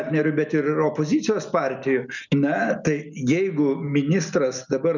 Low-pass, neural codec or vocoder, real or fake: 7.2 kHz; none; real